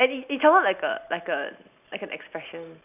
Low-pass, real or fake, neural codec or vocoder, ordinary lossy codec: 3.6 kHz; real; none; none